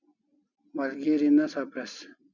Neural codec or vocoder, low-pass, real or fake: none; 7.2 kHz; real